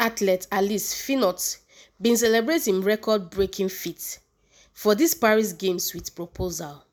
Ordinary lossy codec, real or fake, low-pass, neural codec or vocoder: none; real; none; none